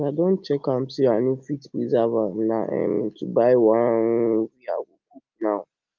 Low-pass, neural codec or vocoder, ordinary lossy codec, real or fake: 7.2 kHz; none; Opus, 24 kbps; real